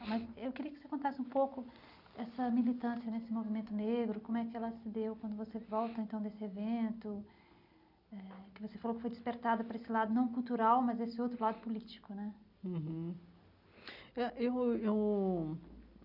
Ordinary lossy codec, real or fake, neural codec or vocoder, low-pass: none; real; none; 5.4 kHz